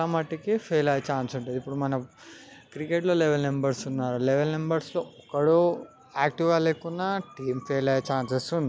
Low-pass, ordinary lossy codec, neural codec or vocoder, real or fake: none; none; none; real